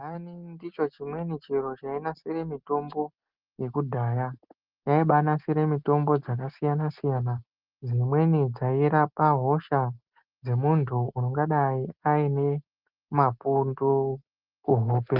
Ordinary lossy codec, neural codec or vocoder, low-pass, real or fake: Opus, 32 kbps; none; 5.4 kHz; real